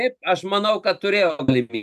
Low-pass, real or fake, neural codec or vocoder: 14.4 kHz; real; none